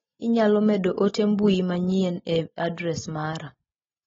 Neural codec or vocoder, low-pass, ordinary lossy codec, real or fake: none; 7.2 kHz; AAC, 24 kbps; real